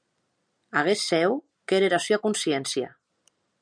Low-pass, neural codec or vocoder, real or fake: 9.9 kHz; none; real